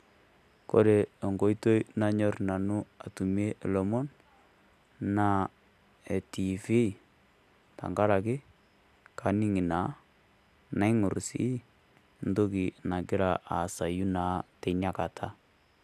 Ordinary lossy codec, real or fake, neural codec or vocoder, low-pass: none; real; none; 14.4 kHz